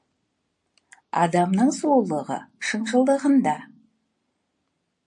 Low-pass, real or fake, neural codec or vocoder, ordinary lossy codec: 9.9 kHz; real; none; MP3, 48 kbps